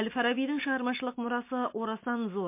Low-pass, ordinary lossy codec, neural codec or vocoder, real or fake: 3.6 kHz; MP3, 24 kbps; none; real